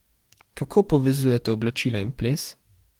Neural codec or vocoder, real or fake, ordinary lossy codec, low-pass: codec, 44.1 kHz, 2.6 kbps, DAC; fake; Opus, 24 kbps; 19.8 kHz